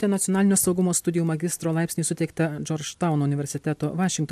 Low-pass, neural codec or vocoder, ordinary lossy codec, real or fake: 14.4 kHz; none; AAC, 96 kbps; real